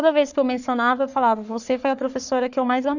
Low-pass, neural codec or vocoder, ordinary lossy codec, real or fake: 7.2 kHz; codec, 44.1 kHz, 3.4 kbps, Pupu-Codec; none; fake